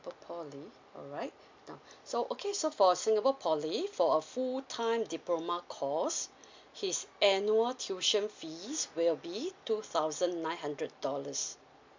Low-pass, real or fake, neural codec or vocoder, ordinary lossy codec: 7.2 kHz; real; none; MP3, 64 kbps